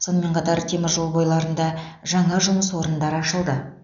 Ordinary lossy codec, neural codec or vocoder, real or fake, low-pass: none; none; real; 7.2 kHz